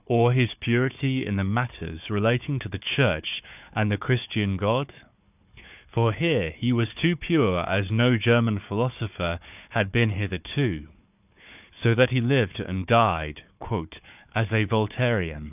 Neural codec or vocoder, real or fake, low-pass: codec, 16 kHz, 4 kbps, FunCodec, trained on Chinese and English, 50 frames a second; fake; 3.6 kHz